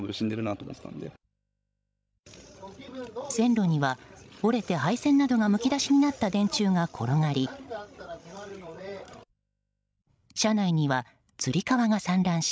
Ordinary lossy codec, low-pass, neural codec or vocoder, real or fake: none; none; codec, 16 kHz, 16 kbps, FreqCodec, larger model; fake